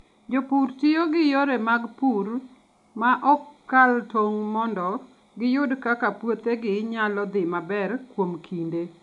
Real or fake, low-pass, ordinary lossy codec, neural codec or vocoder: real; 10.8 kHz; none; none